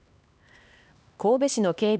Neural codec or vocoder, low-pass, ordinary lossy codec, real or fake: codec, 16 kHz, 2 kbps, X-Codec, HuBERT features, trained on LibriSpeech; none; none; fake